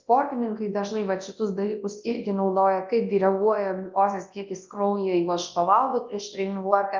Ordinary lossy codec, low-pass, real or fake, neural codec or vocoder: Opus, 24 kbps; 7.2 kHz; fake; codec, 24 kHz, 0.9 kbps, WavTokenizer, large speech release